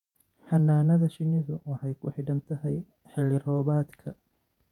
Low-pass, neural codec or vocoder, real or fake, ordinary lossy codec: 19.8 kHz; vocoder, 48 kHz, 128 mel bands, Vocos; fake; none